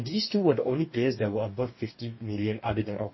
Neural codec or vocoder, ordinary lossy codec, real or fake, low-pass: codec, 44.1 kHz, 2.6 kbps, DAC; MP3, 24 kbps; fake; 7.2 kHz